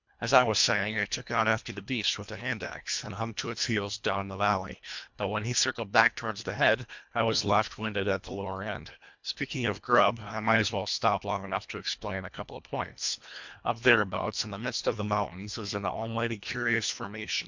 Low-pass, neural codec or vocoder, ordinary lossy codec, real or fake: 7.2 kHz; codec, 24 kHz, 1.5 kbps, HILCodec; MP3, 64 kbps; fake